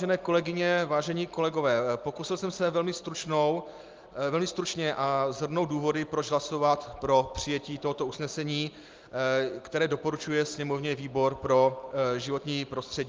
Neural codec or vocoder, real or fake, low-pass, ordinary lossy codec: none; real; 7.2 kHz; Opus, 32 kbps